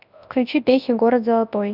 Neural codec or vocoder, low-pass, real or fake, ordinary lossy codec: codec, 24 kHz, 0.9 kbps, WavTokenizer, large speech release; 5.4 kHz; fake; none